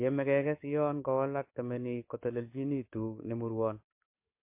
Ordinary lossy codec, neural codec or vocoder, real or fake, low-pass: MP3, 24 kbps; codec, 44.1 kHz, 7.8 kbps, DAC; fake; 3.6 kHz